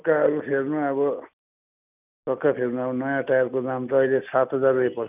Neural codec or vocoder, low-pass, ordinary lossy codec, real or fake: none; 3.6 kHz; none; real